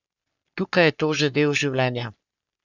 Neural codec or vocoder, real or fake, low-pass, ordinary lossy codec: codec, 44.1 kHz, 3.4 kbps, Pupu-Codec; fake; 7.2 kHz; none